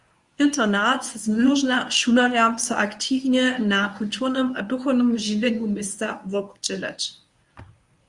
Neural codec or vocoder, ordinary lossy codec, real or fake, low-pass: codec, 24 kHz, 0.9 kbps, WavTokenizer, medium speech release version 1; Opus, 64 kbps; fake; 10.8 kHz